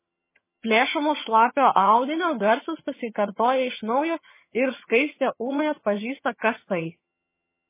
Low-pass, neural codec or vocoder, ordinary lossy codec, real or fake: 3.6 kHz; vocoder, 22.05 kHz, 80 mel bands, HiFi-GAN; MP3, 16 kbps; fake